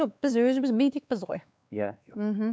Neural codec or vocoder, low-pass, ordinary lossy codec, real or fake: codec, 16 kHz, 2 kbps, X-Codec, WavLM features, trained on Multilingual LibriSpeech; none; none; fake